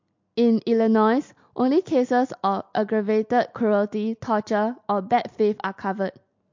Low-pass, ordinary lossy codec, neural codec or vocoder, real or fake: 7.2 kHz; MP3, 48 kbps; none; real